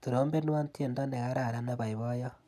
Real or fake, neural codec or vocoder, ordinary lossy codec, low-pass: real; none; none; 14.4 kHz